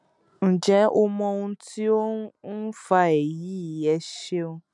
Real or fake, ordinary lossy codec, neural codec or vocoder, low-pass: real; none; none; 10.8 kHz